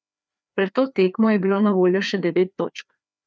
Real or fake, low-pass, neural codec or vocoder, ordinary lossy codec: fake; none; codec, 16 kHz, 2 kbps, FreqCodec, larger model; none